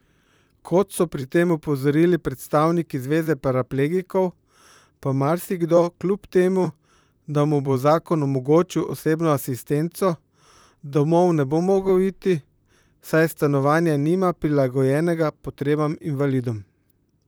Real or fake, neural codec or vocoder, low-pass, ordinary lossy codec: fake; vocoder, 44.1 kHz, 128 mel bands, Pupu-Vocoder; none; none